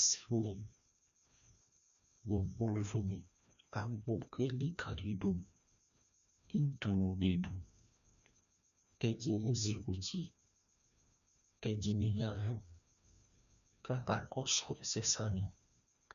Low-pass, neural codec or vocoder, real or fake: 7.2 kHz; codec, 16 kHz, 1 kbps, FreqCodec, larger model; fake